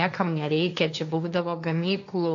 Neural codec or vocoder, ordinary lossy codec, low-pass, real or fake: codec, 16 kHz, 1.1 kbps, Voila-Tokenizer; AAC, 64 kbps; 7.2 kHz; fake